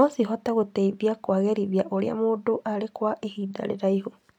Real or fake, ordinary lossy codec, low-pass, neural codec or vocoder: real; none; 19.8 kHz; none